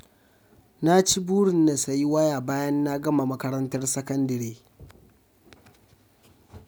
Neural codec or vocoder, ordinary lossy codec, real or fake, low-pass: none; none; real; none